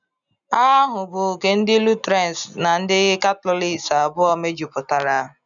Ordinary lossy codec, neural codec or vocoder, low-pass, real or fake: none; none; 7.2 kHz; real